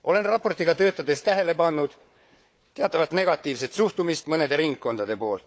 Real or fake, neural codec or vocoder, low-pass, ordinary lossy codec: fake; codec, 16 kHz, 4 kbps, FunCodec, trained on Chinese and English, 50 frames a second; none; none